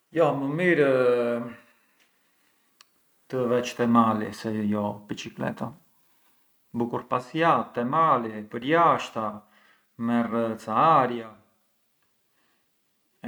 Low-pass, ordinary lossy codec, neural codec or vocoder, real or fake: none; none; none; real